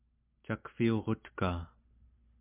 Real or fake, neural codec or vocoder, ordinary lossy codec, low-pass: real; none; MP3, 32 kbps; 3.6 kHz